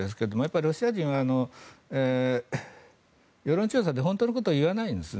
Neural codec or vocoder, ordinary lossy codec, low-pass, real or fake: none; none; none; real